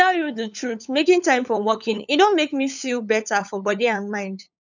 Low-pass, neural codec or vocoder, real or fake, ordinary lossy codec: 7.2 kHz; codec, 16 kHz, 8 kbps, FunCodec, trained on LibriTTS, 25 frames a second; fake; none